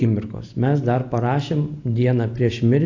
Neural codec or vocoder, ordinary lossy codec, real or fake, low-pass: none; AAC, 48 kbps; real; 7.2 kHz